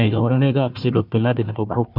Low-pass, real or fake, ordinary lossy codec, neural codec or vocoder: 5.4 kHz; fake; none; codec, 16 kHz, 1 kbps, FunCodec, trained on Chinese and English, 50 frames a second